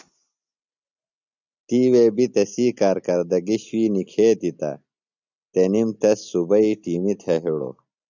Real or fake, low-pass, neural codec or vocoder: real; 7.2 kHz; none